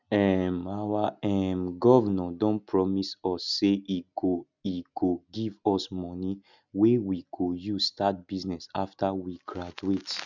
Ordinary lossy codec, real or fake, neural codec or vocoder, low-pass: none; real; none; 7.2 kHz